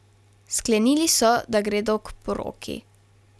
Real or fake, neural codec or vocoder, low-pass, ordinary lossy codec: real; none; none; none